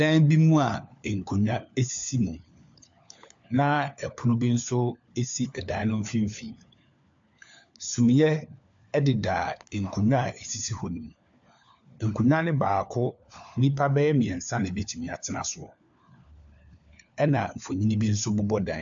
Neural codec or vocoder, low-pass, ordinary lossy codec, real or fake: codec, 16 kHz, 4 kbps, FunCodec, trained on LibriTTS, 50 frames a second; 7.2 kHz; AAC, 64 kbps; fake